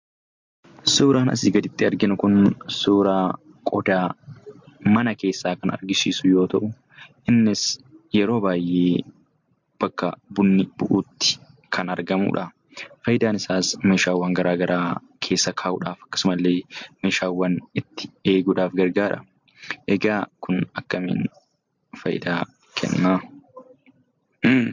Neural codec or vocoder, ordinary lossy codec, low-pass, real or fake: none; MP3, 48 kbps; 7.2 kHz; real